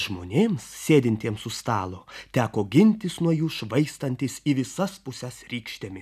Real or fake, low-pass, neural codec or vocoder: real; 14.4 kHz; none